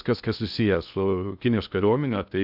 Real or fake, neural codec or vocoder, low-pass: fake; codec, 16 kHz in and 24 kHz out, 0.6 kbps, FocalCodec, streaming, 2048 codes; 5.4 kHz